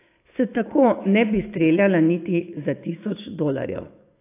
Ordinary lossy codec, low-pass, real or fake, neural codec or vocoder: AAC, 24 kbps; 3.6 kHz; fake; autoencoder, 48 kHz, 128 numbers a frame, DAC-VAE, trained on Japanese speech